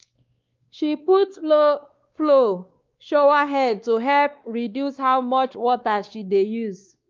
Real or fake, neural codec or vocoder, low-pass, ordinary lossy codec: fake; codec, 16 kHz, 2 kbps, X-Codec, WavLM features, trained on Multilingual LibriSpeech; 7.2 kHz; Opus, 32 kbps